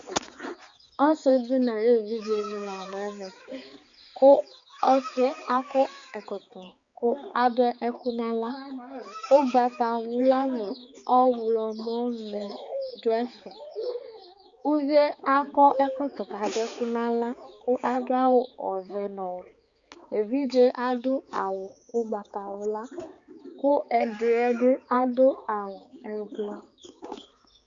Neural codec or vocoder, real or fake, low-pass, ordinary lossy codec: codec, 16 kHz, 4 kbps, X-Codec, HuBERT features, trained on balanced general audio; fake; 7.2 kHz; Opus, 64 kbps